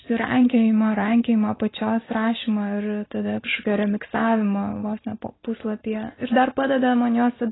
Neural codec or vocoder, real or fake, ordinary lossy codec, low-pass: none; real; AAC, 16 kbps; 7.2 kHz